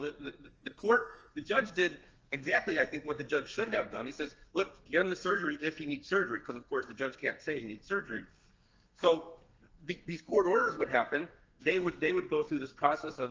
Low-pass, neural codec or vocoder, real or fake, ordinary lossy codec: 7.2 kHz; codec, 32 kHz, 1.9 kbps, SNAC; fake; Opus, 24 kbps